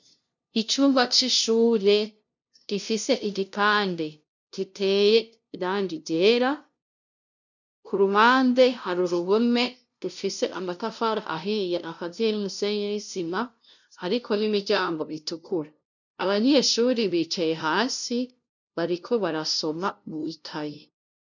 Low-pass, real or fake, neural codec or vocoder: 7.2 kHz; fake; codec, 16 kHz, 0.5 kbps, FunCodec, trained on LibriTTS, 25 frames a second